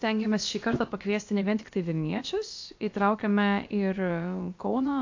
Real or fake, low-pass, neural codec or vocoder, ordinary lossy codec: fake; 7.2 kHz; codec, 16 kHz, about 1 kbps, DyCAST, with the encoder's durations; AAC, 48 kbps